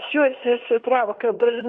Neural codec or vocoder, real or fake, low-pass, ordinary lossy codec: codec, 16 kHz in and 24 kHz out, 0.9 kbps, LongCat-Audio-Codec, fine tuned four codebook decoder; fake; 10.8 kHz; MP3, 64 kbps